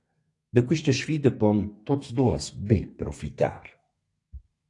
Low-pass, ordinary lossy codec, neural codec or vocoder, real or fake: 10.8 kHz; AAC, 48 kbps; codec, 32 kHz, 1.9 kbps, SNAC; fake